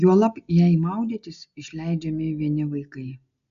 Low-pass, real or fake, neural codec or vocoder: 7.2 kHz; real; none